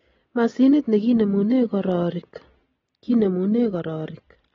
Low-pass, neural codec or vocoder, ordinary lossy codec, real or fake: 7.2 kHz; none; AAC, 24 kbps; real